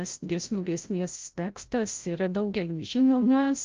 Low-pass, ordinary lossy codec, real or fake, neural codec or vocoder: 7.2 kHz; Opus, 32 kbps; fake; codec, 16 kHz, 0.5 kbps, FreqCodec, larger model